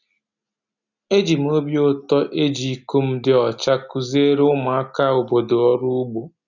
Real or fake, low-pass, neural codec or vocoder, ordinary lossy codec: real; 7.2 kHz; none; none